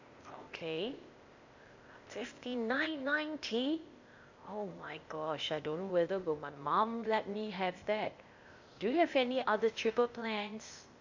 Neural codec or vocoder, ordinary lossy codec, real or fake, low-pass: codec, 16 kHz, 0.8 kbps, ZipCodec; MP3, 64 kbps; fake; 7.2 kHz